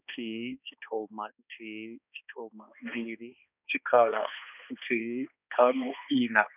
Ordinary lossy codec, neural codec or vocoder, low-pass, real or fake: none; codec, 16 kHz, 2 kbps, X-Codec, HuBERT features, trained on balanced general audio; 3.6 kHz; fake